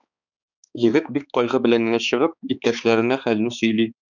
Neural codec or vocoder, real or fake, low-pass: codec, 16 kHz, 4 kbps, X-Codec, HuBERT features, trained on balanced general audio; fake; 7.2 kHz